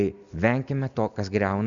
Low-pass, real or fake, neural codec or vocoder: 7.2 kHz; real; none